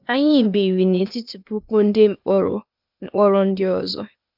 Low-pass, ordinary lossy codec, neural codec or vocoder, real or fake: 5.4 kHz; none; codec, 16 kHz, 0.8 kbps, ZipCodec; fake